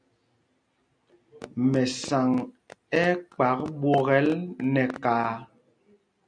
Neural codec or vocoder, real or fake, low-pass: none; real; 9.9 kHz